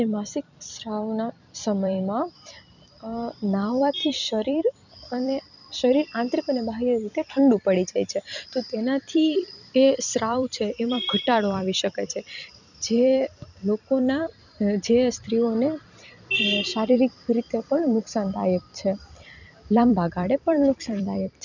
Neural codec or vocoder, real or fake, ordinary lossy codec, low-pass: vocoder, 44.1 kHz, 128 mel bands every 256 samples, BigVGAN v2; fake; none; 7.2 kHz